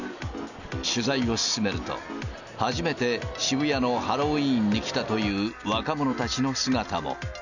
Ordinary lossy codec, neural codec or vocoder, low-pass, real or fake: none; none; 7.2 kHz; real